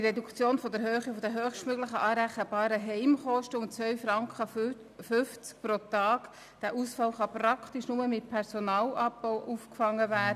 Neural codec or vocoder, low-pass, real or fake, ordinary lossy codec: none; 14.4 kHz; real; none